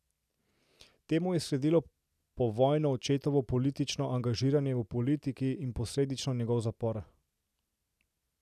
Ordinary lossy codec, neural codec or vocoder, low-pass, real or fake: none; none; 14.4 kHz; real